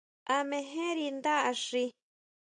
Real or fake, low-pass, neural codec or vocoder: real; 9.9 kHz; none